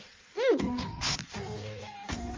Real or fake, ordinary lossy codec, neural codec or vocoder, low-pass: fake; Opus, 32 kbps; codec, 16 kHz in and 24 kHz out, 1.1 kbps, FireRedTTS-2 codec; 7.2 kHz